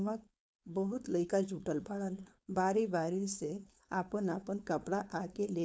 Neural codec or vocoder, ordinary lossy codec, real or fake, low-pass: codec, 16 kHz, 4.8 kbps, FACodec; none; fake; none